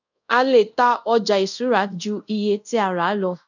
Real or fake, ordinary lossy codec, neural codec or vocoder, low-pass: fake; none; codec, 24 kHz, 0.5 kbps, DualCodec; 7.2 kHz